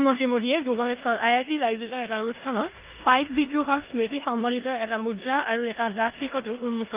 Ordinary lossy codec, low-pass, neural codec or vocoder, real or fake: Opus, 32 kbps; 3.6 kHz; codec, 16 kHz in and 24 kHz out, 0.9 kbps, LongCat-Audio-Codec, four codebook decoder; fake